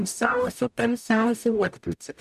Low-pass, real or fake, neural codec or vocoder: 14.4 kHz; fake; codec, 44.1 kHz, 0.9 kbps, DAC